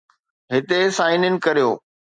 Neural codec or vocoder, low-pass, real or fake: none; 9.9 kHz; real